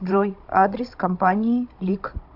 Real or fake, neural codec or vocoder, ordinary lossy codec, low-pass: fake; codec, 16 kHz, 16 kbps, FunCodec, trained on Chinese and English, 50 frames a second; AAC, 48 kbps; 5.4 kHz